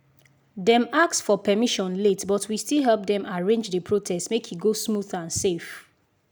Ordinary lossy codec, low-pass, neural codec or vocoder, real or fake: none; none; none; real